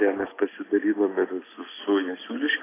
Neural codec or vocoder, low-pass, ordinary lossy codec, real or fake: none; 3.6 kHz; AAC, 16 kbps; real